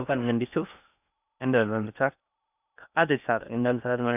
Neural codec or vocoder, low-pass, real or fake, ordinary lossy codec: codec, 16 kHz in and 24 kHz out, 0.6 kbps, FocalCodec, streaming, 2048 codes; 3.6 kHz; fake; none